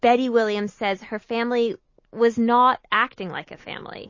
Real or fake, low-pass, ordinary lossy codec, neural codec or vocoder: real; 7.2 kHz; MP3, 32 kbps; none